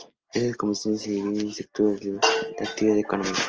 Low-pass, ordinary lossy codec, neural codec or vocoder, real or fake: 7.2 kHz; Opus, 24 kbps; none; real